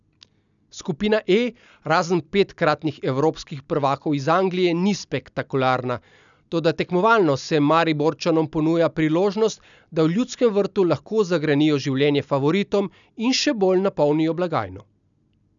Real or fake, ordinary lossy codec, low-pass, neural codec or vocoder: real; none; 7.2 kHz; none